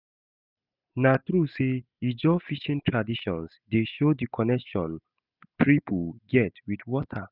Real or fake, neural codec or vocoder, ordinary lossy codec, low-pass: real; none; none; 5.4 kHz